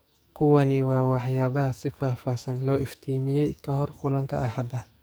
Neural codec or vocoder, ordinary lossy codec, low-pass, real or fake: codec, 44.1 kHz, 2.6 kbps, SNAC; none; none; fake